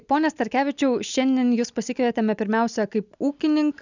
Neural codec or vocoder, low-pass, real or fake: none; 7.2 kHz; real